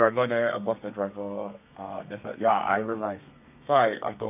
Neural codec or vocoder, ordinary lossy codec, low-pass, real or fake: codec, 32 kHz, 1.9 kbps, SNAC; none; 3.6 kHz; fake